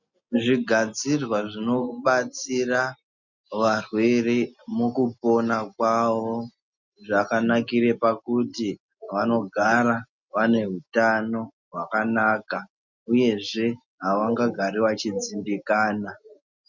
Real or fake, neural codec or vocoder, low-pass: real; none; 7.2 kHz